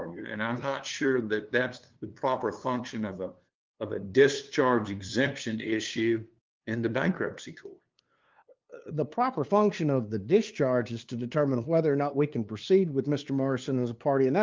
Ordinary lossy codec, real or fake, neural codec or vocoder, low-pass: Opus, 32 kbps; fake; codec, 16 kHz, 2 kbps, FunCodec, trained on LibriTTS, 25 frames a second; 7.2 kHz